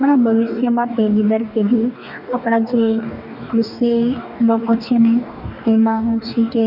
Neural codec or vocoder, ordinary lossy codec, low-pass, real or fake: codec, 44.1 kHz, 2.6 kbps, DAC; none; 5.4 kHz; fake